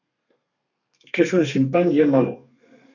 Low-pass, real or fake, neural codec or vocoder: 7.2 kHz; fake; codec, 32 kHz, 1.9 kbps, SNAC